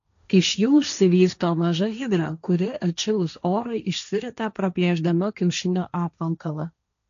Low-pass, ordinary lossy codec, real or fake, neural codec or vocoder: 7.2 kHz; AAC, 96 kbps; fake; codec, 16 kHz, 1.1 kbps, Voila-Tokenizer